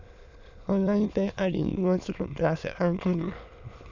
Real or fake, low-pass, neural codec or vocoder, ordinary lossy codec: fake; 7.2 kHz; autoencoder, 22.05 kHz, a latent of 192 numbers a frame, VITS, trained on many speakers; none